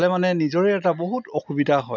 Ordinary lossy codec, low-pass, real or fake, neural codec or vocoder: none; 7.2 kHz; real; none